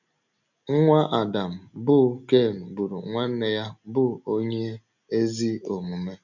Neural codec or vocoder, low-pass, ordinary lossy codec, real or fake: none; 7.2 kHz; none; real